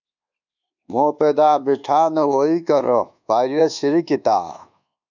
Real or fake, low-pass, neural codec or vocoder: fake; 7.2 kHz; codec, 24 kHz, 1.2 kbps, DualCodec